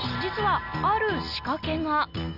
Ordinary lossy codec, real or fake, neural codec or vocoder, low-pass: none; real; none; 5.4 kHz